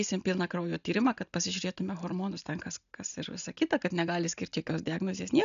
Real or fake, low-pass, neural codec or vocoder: real; 7.2 kHz; none